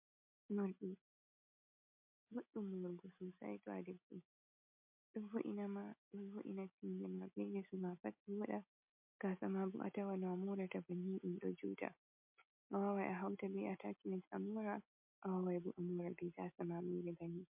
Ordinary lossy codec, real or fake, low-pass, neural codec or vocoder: MP3, 32 kbps; real; 3.6 kHz; none